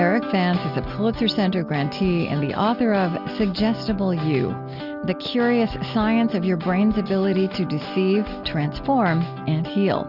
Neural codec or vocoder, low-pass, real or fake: none; 5.4 kHz; real